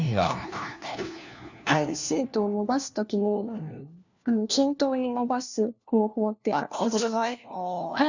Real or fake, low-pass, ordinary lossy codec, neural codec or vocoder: fake; 7.2 kHz; none; codec, 16 kHz, 1 kbps, FunCodec, trained on LibriTTS, 50 frames a second